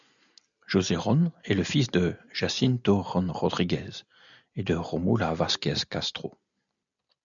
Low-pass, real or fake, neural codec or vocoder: 7.2 kHz; real; none